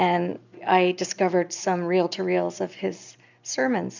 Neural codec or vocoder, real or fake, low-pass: none; real; 7.2 kHz